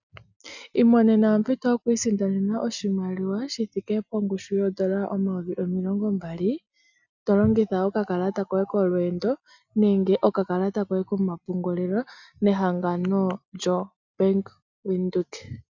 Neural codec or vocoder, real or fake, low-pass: none; real; 7.2 kHz